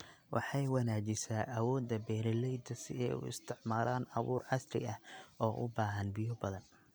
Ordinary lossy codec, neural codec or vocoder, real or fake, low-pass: none; none; real; none